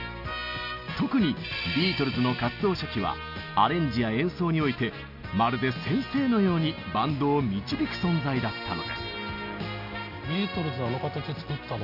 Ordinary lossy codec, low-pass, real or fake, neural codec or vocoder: none; 5.4 kHz; real; none